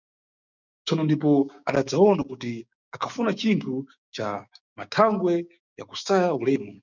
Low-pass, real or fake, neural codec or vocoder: 7.2 kHz; fake; codec, 16 kHz, 6 kbps, DAC